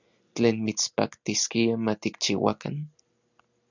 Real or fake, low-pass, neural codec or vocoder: real; 7.2 kHz; none